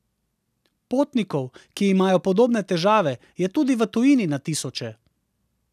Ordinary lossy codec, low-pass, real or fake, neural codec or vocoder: none; 14.4 kHz; real; none